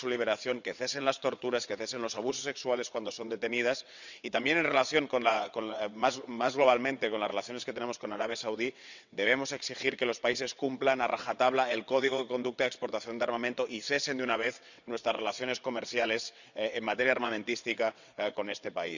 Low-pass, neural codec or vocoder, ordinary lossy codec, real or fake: 7.2 kHz; vocoder, 22.05 kHz, 80 mel bands, WaveNeXt; none; fake